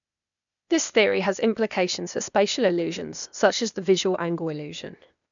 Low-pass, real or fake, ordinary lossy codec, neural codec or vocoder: 7.2 kHz; fake; none; codec, 16 kHz, 0.8 kbps, ZipCodec